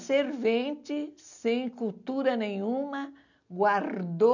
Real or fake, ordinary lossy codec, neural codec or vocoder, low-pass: real; none; none; 7.2 kHz